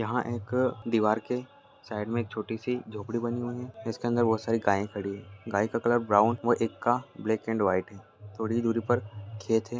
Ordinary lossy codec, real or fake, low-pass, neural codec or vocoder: none; real; none; none